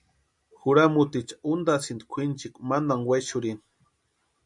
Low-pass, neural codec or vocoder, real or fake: 10.8 kHz; none; real